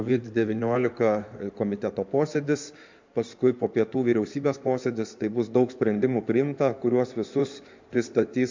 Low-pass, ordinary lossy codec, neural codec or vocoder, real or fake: 7.2 kHz; MP3, 64 kbps; codec, 16 kHz in and 24 kHz out, 2.2 kbps, FireRedTTS-2 codec; fake